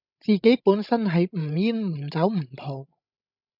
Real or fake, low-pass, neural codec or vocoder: fake; 5.4 kHz; codec, 16 kHz, 16 kbps, FreqCodec, larger model